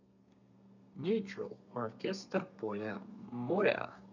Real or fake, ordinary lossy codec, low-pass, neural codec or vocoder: fake; none; 7.2 kHz; codec, 32 kHz, 1.9 kbps, SNAC